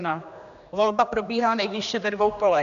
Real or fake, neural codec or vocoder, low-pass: fake; codec, 16 kHz, 2 kbps, X-Codec, HuBERT features, trained on general audio; 7.2 kHz